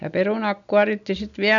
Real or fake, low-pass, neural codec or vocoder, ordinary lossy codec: real; 7.2 kHz; none; none